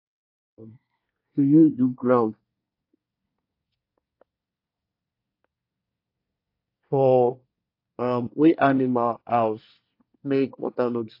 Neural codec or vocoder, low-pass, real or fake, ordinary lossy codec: codec, 24 kHz, 1 kbps, SNAC; 5.4 kHz; fake; AAC, 32 kbps